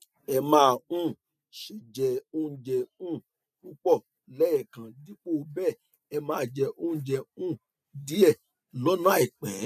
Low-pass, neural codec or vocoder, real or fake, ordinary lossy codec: 14.4 kHz; none; real; none